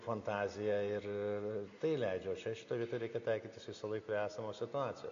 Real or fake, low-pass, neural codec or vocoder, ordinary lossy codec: real; 7.2 kHz; none; AAC, 48 kbps